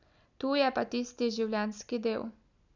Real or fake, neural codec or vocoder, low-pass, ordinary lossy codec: real; none; 7.2 kHz; none